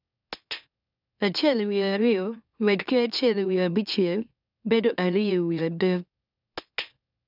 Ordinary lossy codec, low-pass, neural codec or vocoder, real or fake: none; 5.4 kHz; autoencoder, 44.1 kHz, a latent of 192 numbers a frame, MeloTTS; fake